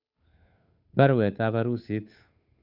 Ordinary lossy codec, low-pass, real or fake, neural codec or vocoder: none; 5.4 kHz; fake; codec, 16 kHz, 8 kbps, FunCodec, trained on Chinese and English, 25 frames a second